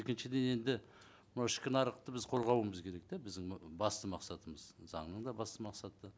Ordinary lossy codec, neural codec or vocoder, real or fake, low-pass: none; none; real; none